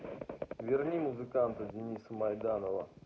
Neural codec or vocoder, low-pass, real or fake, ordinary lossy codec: none; none; real; none